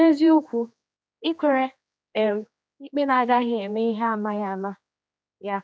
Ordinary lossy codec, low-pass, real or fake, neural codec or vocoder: none; none; fake; codec, 16 kHz, 2 kbps, X-Codec, HuBERT features, trained on general audio